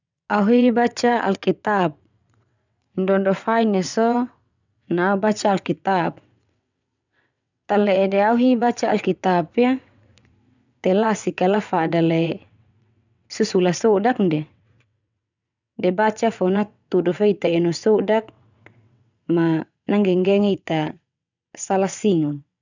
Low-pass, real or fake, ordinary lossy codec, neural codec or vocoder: 7.2 kHz; fake; none; vocoder, 22.05 kHz, 80 mel bands, WaveNeXt